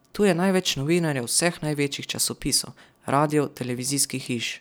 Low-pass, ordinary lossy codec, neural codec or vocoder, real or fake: none; none; none; real